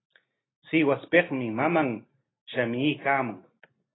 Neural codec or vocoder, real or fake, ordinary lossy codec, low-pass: none; real; AAC, 16 kbps; 7.2 kHz